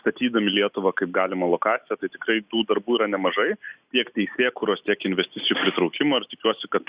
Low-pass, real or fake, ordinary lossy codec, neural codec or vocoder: 3.6 kHz; real; Opus, 64 kbps; none